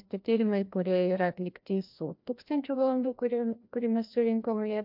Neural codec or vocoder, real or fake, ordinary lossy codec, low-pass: codec, 16 kHz, 1 kbps, FreqCodec, larger model; fake; MP3, 48 kbps; 5.4 kHz